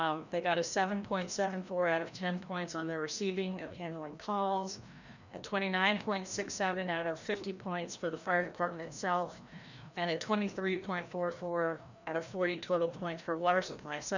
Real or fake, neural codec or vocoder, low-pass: fake; codec, 16 kHz, 1 kbps, FreqCodec, larger model; 7.2 kHz